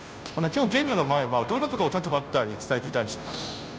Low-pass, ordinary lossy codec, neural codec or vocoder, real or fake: none; none; codec, 16 kHz, 0.5 kbps, FunCodec, trained on Chinese and English, 25 frames a second; fake